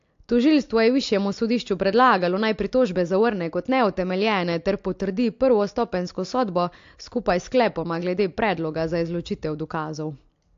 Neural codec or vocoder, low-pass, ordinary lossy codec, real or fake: none; 7.2 kHz; AAC, 48 kbps; real